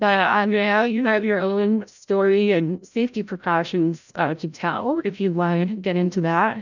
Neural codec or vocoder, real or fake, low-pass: codec, 16 kHz, 0.5 kbps, FreqCodec, larger model; fake; 7.2 kHz